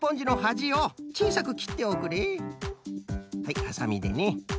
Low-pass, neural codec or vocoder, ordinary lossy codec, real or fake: none; none; none; real